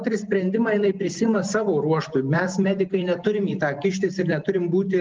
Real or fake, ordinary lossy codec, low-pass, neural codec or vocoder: real; Opus, 24 kbps; 7.2 kHz; none